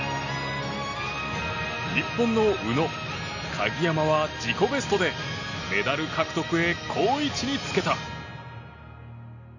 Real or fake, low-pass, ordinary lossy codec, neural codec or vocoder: real; 7.2 kHz; none; none